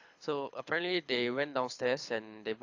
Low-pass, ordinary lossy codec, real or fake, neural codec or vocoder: 7.2 kHz; AAC, 48 kbps; fake; codec, 24 kHz, 6 kbps, HILCodec